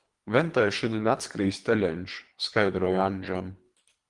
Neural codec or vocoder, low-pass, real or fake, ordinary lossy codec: codec, 24 kHz, 3 kbps, HILCodec; 10.8 kHz; fake; Opus, 24 kbps